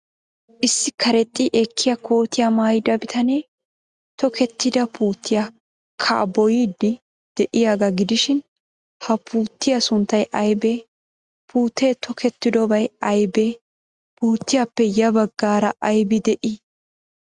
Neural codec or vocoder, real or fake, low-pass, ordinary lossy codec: none; real; 10.8 kHz; Opus, 64 kbps